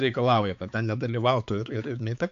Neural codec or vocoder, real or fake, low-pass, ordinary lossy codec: codec, 16 kHz, 4 kbps, X-Codec, HuBERT features, trained on balanced general audio; fake; 7.2 kHz; AAC, 64 kbps